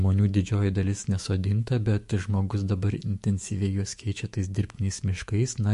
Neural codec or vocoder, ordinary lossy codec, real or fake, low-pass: autoencoder, 48 kHz, 128 numbers a frame, DAC-VAE, trained on Japanese speech; MP3, 48 kbps; fake; 14.4 kHz